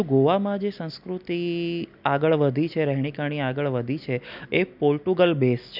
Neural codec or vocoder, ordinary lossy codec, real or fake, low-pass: none; none; real; 5.4 kHz